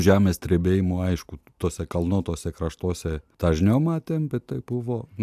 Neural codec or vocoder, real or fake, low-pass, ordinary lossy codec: none; real; 14.4 kHz; AAC, 96 kbps